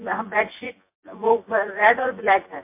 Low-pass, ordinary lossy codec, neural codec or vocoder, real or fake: 3.6 kHz; MP3, 24 kbps; vocoder, 24 kHz, 100 mel bands, Vocos; fake